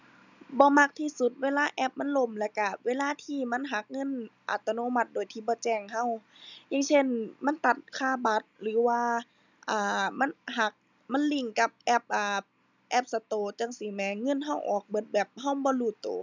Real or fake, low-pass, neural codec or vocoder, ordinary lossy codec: real; 7.2 kHz; none; none